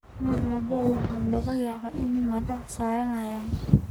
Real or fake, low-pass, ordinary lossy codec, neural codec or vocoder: fake; none; none; codec, 44.1 kHz, 1.7 kbps, Pupu-Codec